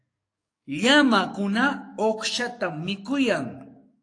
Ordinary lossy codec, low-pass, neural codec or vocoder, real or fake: AAC, 48 kbps; 9.9 kHz; codec, 44.1 kHz, 7.8 kbps, DAC; fake